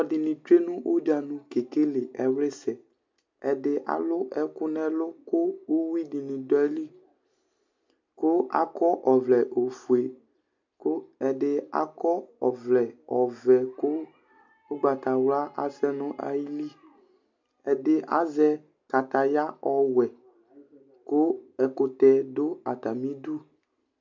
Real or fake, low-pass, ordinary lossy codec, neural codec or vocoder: real; 7.2 kHz; MP3, 64 kbps; none